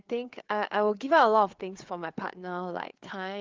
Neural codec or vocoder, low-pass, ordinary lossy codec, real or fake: codec, 16 kHz, 4 kbps, FreqCodec, larger model; 7.2 kHz; Opus, 32 kbps; fake